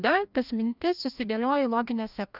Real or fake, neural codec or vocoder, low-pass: fake; codec, 16 kHz, 1 kbps, FreqCodec, larger model; 5.4 kHz